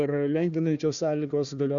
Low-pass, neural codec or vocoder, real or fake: 7.2 kHz; codec, 16 kHz, 1 kbps, FunCodec, trained on Chinese and English, 50 frames a second; fake